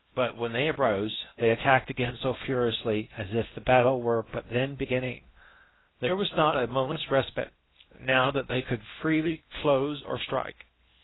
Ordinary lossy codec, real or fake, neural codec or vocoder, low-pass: AAC, 16 kbps; fake; codec, 16 kHz in and 24 kHz out, 0.6 kbps, FocalCodec, streaming, 4096 codes; 7.2 kHz